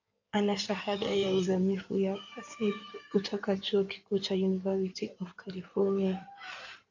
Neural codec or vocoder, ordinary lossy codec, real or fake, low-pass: codec, 16 kHz in and 24 kHz out, 2.2 kbps, FireRedTTS-2 codec; AAC, 48 kbps; fake; 7.2 kHz